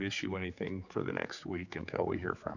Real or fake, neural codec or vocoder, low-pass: fake; codec, 16 kHz, 4 kbps, X-Codec, HuBERT features, trained on general audio; 7.2 kHz